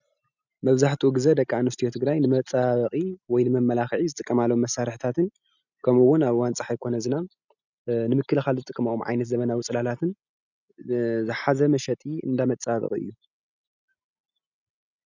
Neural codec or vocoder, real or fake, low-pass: none; real; 7.2 kHz